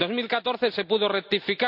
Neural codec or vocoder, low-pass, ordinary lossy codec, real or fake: none; 5.4 kHz; none; real